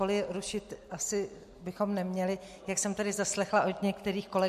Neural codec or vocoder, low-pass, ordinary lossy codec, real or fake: none; 14.4 kHz; MP3, 64 kbps; real